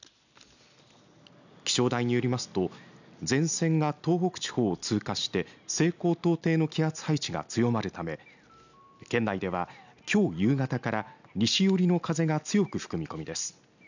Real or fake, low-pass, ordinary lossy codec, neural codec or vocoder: real; 7.2 kHz; none; none